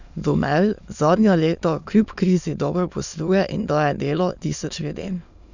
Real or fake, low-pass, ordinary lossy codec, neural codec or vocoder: fake; 7.2 kHz; none; autoencoder, 22.05 kHz, a latent of 192 numbers a frame, VITS, trained on many speakers